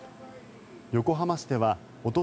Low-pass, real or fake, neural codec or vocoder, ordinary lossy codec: none; real; none; none